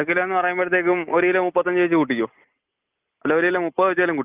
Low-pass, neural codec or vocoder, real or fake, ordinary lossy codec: 3.6 kHz; none; real; Opus, 32 kbps